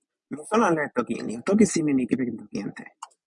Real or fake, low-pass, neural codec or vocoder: real; 10.8 kHz; none